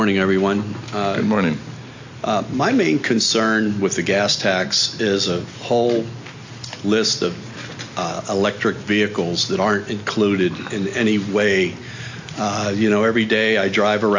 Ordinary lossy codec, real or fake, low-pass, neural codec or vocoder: AAC, 48 kbps; real; 7.2 kHz; none